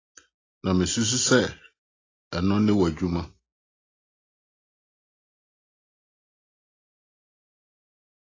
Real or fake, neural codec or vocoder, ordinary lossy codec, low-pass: real; none; AAC, 32 kbps; 7.2 kHz